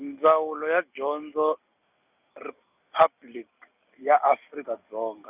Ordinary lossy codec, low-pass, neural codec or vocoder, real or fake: none; 3.6 kHz; none; real